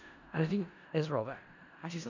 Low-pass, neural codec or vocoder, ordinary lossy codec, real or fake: 7.2 kHz; codec, 16 kHz in and 24 kHz out, 0.4 kbps, LongCat-Audio-Codec, four codebook decoder; none; fake